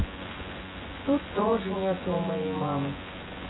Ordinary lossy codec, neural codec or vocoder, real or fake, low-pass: AAC, 16 kbps; vocoder, 24 kHz, 100 mel bands, Vocos; fake; 7.2 kHz